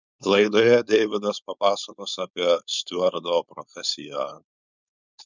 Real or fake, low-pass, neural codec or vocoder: fake; 7.2 kHz; codec, 16 kHz, 4.8 kbps, FACodec